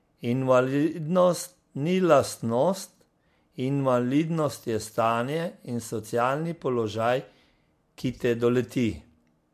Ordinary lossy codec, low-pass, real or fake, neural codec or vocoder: MP3, 64 kbps; 14.4 kHz; real; none